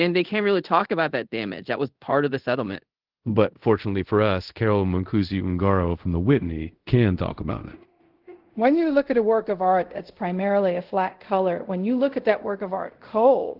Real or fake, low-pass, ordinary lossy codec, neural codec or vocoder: fake; 5.4 kHz; Opus, 16 kbps; codec, 24 kHz, 0.5 kbps, DualCodec